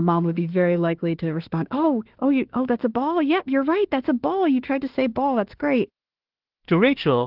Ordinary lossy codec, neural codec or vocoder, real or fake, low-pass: Opus, 16 kbps; autoencoder, 48 kHz, 32 numbers a frame, DAC-VAE, trained on Japanese speech; fake; 5.4 kHz